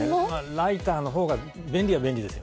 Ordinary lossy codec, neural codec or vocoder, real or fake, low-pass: none; none; real; none